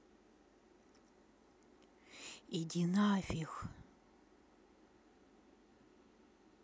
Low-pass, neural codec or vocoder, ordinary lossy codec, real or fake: none; none; none; real